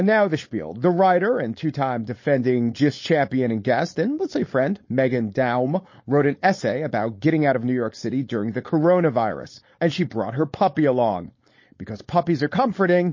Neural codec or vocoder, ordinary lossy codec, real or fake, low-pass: codec, 16 kHz, 4.8 kbps, FACodec; MP3, 32 kbps; fake; 7.2 kHz